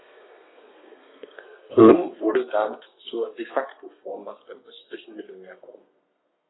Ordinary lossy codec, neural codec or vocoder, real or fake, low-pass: AAC, 16 kbps; codec, 32 kHz, 1.9 kbps, SNAC; fake; 7.2 kHz